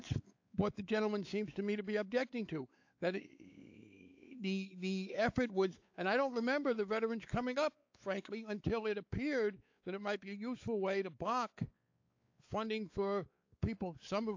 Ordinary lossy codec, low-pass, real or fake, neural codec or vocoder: AAC, 48 kbps; 7.2 kHz; fake; codec, 16 kHz, 4 kbps, X-Codec, WavLM features, trained on Multilingual LibriSpeech